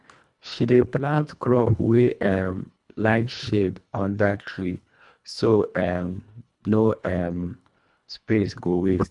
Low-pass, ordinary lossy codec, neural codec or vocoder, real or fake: 10.8 kHz; none; codec, 24 kHz, 1.5 kbps, HILCodec; fake